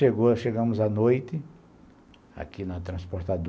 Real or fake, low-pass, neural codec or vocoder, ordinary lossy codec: real; none; none; none